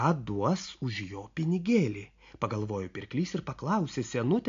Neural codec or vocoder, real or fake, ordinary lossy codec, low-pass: none; real; AAC, 48 kbps; 7.2 kHz